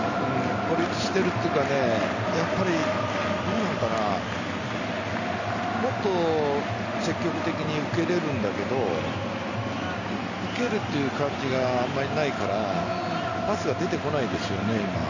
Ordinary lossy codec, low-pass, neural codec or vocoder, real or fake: none; 7.2 kHz; none; real